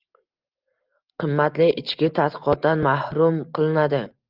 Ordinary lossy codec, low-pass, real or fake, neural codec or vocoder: Opus, 24 kbps; 5.4 kHz; real; none